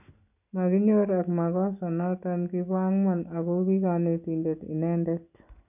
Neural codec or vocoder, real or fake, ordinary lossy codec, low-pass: none; real; none; 3.6 kHz